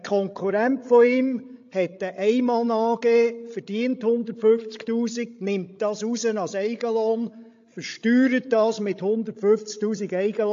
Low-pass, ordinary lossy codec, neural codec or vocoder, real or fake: 7.2 kHz; AAC, 48 kbps; codec, 16 kHz, 16 kbps, FreqCodec, larger model; fake